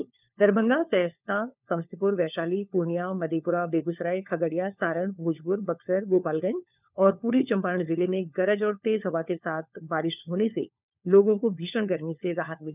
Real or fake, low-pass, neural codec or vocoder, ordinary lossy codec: fake; 3.6 kHz; codec, 16 kHz, 4 kbps, FunCodec, trained on LibriTTS, 50 frames a second; none